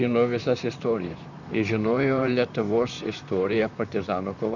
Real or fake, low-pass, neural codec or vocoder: fake; 7.2 kHz; vocoder, 44.1 kHz, 128 mel bands, Pupu-Vocoder